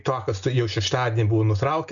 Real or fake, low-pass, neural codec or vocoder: real; 7.2 kHz; none